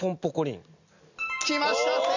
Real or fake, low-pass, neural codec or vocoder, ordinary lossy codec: real; 7.2 kHz; none; none